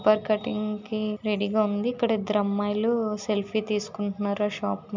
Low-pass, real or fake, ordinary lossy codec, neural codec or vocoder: 7.2 kHz; real; MP3, 64 kbps; none